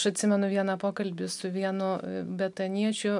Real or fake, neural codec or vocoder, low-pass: real; none; 10.8 kHz